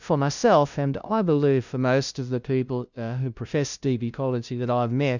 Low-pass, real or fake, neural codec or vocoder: 7.2 kHz; fake; codec, 16 kHz, 0.5 kbps, FunCodec, trained on LibriTTS, 25 frames a second